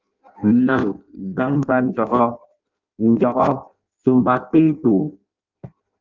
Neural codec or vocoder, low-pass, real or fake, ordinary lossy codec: codec, 16 kHz in and 24 kHz out, 0.6 kbps, FireRedTTS-2 codec; 7.2 kHz; fake; Opus, 24 kbps